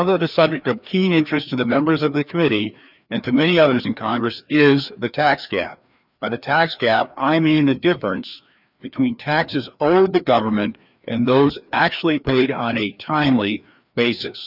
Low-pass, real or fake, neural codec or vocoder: 5.4 kHz; fake; codec, 16 kHz, 2 kbps, FreqCodec, larger model